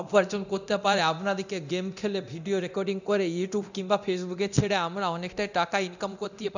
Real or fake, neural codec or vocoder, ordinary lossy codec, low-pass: fake; codec, 24 kHz, 0.9 kbps, DualCodec; none; 7.2 kHz